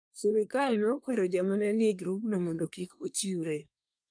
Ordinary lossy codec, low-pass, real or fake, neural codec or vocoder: none; 9.9 kHz; fake; codec, 24 kHz, 1 kbps, SNAC